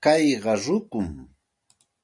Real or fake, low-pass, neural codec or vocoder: real; 10.8 kHz; none